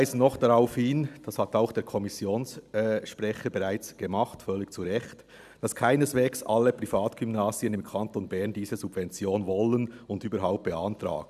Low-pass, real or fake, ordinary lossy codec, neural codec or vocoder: 14.4 kHz; real; none; none